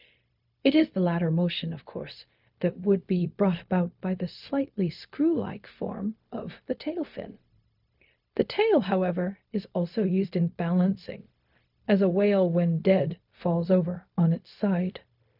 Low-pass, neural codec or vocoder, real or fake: 5.4 kHz; codec, 16 kHz, 0.4 kbps, LongCat-Audio-Codec; fake